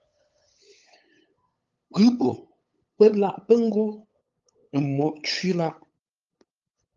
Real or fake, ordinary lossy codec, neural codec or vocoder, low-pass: fake; Opus, 32 kbps; codec, 16 kHz, 8 kbps, FunCodec, trained on LibriTTS, 25 frames a second; 7.2 kHz